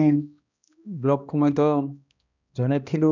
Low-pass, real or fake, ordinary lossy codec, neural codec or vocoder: 7.2 kHz; fake; none; codec, 16 kHz, 1 kbps, X-Codec, HuBERT features, trained on balanced general audio